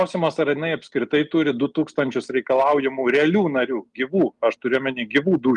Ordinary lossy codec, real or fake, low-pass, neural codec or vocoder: Opus, 32 kbps; real; 10.8 kHz; none